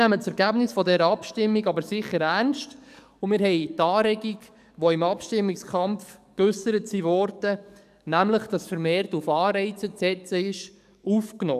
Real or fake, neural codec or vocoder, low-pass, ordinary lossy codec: fake; codec, 44.1 kHz, 7.8 kbps, DAC; 14.4 kHz; none